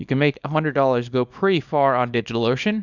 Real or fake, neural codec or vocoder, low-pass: fake; codec, 24 kHz, 0.9 kbps, WavTokenizer, small release; 7.2 kHz